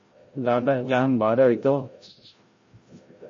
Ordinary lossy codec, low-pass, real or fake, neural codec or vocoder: MP3, 32 kbps; 7.2 kHz; fake; codec, 16 kHz, 0.5 kbps, FreqCodec, larger model